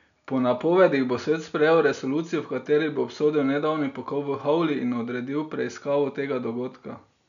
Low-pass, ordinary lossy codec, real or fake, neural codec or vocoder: 7.2 kHz; none; real; none